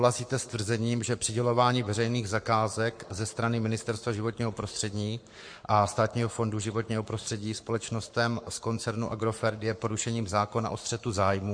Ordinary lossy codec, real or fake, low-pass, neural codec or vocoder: MP3, 48 kbps; fake; 9.9 kHz; codec, 44.1 kHz, 7.8 kbps, Pupu-Codec